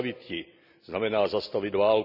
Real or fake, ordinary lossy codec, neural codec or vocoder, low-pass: real; none; none; 5.4 kHz